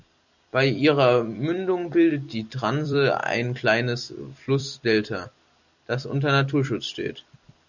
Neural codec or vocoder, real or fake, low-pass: vocoder, 44.1 kHz, 128 mel bands every 256 samples, BigVGAN v2; fake; 7.2 kHz